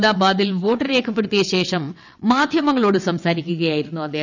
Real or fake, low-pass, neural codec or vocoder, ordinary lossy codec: fake; 7.2 kHz; vocoder, 22.05 kHz, 80 mel bands, WaveNeXt; none